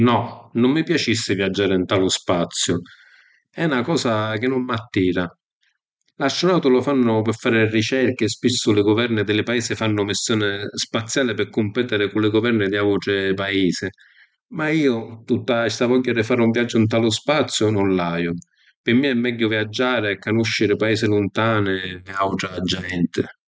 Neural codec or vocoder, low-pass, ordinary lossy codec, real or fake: none; none; none; real